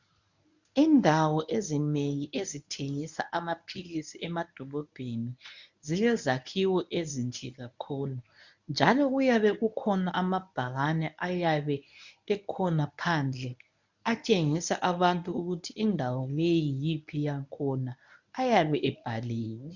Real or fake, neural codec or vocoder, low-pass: fake; codec, 24 kHz, 0.9 kbps, WavTokenizer, medium speech release version 1; 7.2 kHz